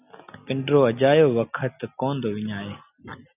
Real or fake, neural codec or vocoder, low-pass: real; none; 3.6 kHz